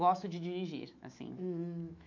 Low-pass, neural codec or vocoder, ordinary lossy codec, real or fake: 7.2 kHz; autoencoder, 48 kHz, 128 numbers a frame, DAC-VAE, trained on Japanese speech; MP3, 48 kbps; fake